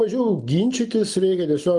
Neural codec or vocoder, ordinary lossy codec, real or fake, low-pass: none; Opus, 32 kbps; real; 10.8 kHz